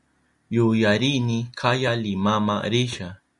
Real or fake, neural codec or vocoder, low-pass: real; none; 10.8 kHz